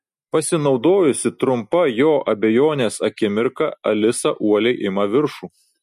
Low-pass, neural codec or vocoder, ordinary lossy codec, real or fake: 14.4 kHz; none; MP3, 64 kbps; real